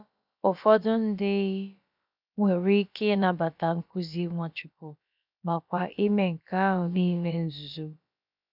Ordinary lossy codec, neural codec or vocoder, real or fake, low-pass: none; codec, 16 kHz, about 1 kbps, DyCAST, with the encoder's durations; fake; 5.4 kHz